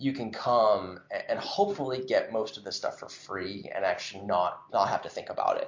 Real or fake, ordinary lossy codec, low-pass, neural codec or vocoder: real; MP3, 48 kbps; 7.2 kHz; none